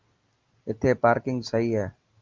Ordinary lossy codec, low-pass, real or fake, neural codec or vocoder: Opus, 24 kbps; 7.2 kHz; real; none